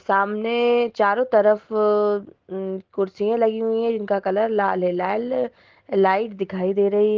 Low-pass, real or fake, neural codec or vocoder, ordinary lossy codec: 7.2 kHz; real; none; Opus, 16 kbps